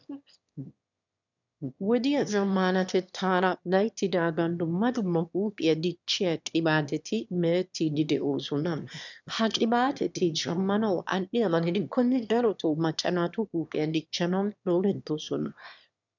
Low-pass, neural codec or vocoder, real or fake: 7.2 kHz; autoencoder, 22.05 kHz, a latent of 192 numbers a frame, VITS, trained on one speaker; fake